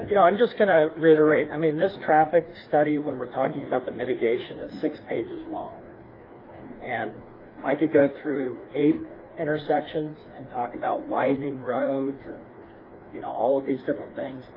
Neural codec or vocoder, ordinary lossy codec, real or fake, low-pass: codec, 16 kHz, 2 kbps, FreqCodec, larger model; AAC, 24 kbps; fake; 5.4 kHz